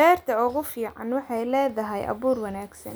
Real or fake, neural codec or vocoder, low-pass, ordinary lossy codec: real; none; none; none